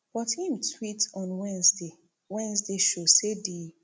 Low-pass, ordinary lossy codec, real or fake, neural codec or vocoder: none; none; real; none